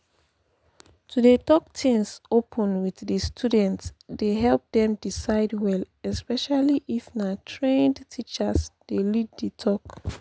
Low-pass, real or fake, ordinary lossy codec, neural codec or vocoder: none; real; none; none